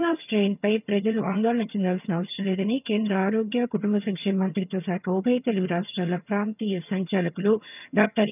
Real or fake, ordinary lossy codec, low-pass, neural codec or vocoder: fake; none; 3.6 kHz; vocoder, 22.05 kHz, 80 mel bands, HiFi-GAN